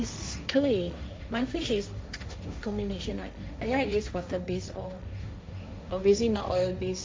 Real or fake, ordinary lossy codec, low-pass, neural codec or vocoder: fake; none; none; codec, 16 kHz, 1.1 kbps, Voila-Tokenizer